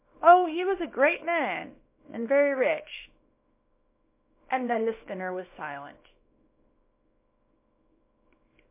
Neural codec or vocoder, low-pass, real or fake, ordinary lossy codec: codec, 24 kHz, 0.9 kbps, WavTokenizer, small release; 3.6 kHz; fake; MP3, 24 kbps